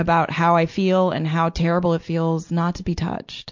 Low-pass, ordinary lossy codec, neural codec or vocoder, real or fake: 7.2 kHz; MP3, 64 kbps; none; real